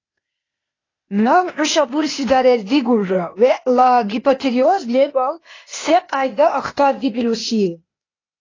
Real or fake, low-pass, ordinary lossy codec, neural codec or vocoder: fake; 7.2 kHz; AAC, 32 kbps; codec, 16 kHz, 0.8 kbps, ZipCodec